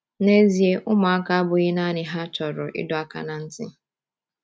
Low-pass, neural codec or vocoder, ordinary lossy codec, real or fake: none; none; none; real